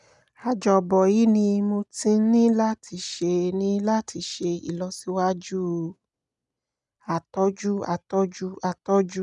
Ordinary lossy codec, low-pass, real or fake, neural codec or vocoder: none; 10.8 kHz; real; none